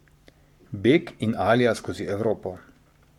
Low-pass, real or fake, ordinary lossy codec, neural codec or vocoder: 19.8 kHz; fake; MP3, 96 kbps; codec, 44.1 kHz, 7.8 kbps, Pupu-Codec